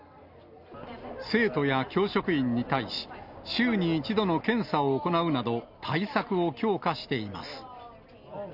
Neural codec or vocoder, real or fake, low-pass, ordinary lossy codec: none; real; 5.4 kHz; none